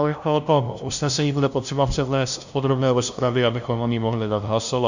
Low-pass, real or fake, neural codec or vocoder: 7.2 kHz; fake; codec, 16 kHz, 0.5 kbps, FunCodec, trained on LibriTTS, 25 frames a second